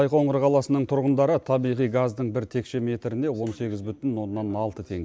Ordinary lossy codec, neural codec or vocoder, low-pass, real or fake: none; none; none; real